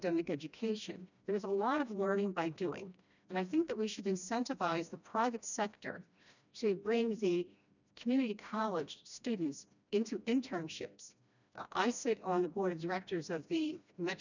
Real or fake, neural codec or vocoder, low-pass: fake; codec, 16 kHz, 1 kbps, FreqCodec, smaller model; 7.2 kHz